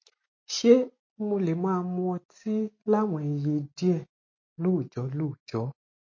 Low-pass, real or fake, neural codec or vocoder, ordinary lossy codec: 7.2 kHz; real; none; MP3, 32 kbps